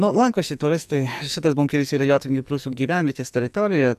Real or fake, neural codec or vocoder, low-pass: fake; codec, 44.1 kHz, 2.6 kbps, SNAC; 14.4 kHz